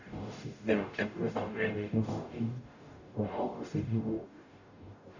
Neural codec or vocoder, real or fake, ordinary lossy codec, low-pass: codec, 44.1 kHz, 0.9 kbps, DAC; fake; none; 7.2 kHz